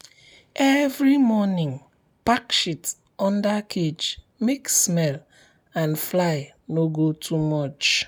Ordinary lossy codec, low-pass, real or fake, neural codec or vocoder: none; none; real; none